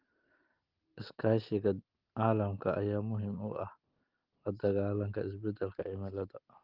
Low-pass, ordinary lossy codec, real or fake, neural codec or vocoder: 5.4 kHz; Opus, 16 kbps; real; none